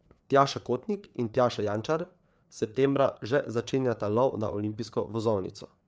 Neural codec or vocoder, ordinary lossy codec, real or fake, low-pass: codec, 16 kHz, 4 kbps, FreqCodec, larger model; none; fake; none